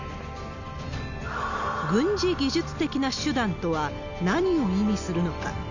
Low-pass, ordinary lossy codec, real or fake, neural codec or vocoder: 7.2 kHz; none; real; none